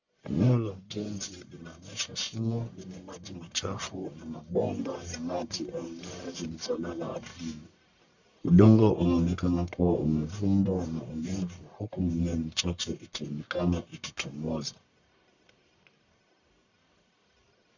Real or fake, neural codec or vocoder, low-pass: fake; codec, 44.1 kHz, 1.7 kbps, Pupu-Codec; 7.2 kHz